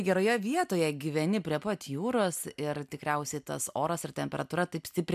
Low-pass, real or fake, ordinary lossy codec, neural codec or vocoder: 14.4 kHz; real; MP3, 96 kbps; none